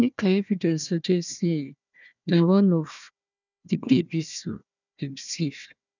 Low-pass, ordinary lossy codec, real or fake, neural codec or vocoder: 7.2 kHz; none; fake; codec, 16 kHz, 1 kbps, FunCodec, trained on Chinese and English, 50 frames a second